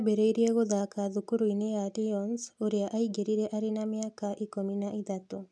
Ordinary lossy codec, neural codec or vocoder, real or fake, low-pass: none; none; real; none